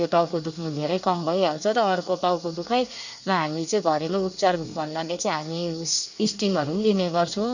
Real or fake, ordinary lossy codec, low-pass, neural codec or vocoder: fake; none; 7.2 kHz; codec, 24 kHz, 1 kbps, SNAC